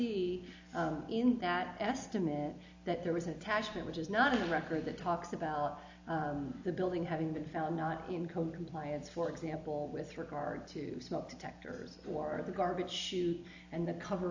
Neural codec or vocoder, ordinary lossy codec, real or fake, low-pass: none; AAC, 48 kbps; real; 7.2 kHz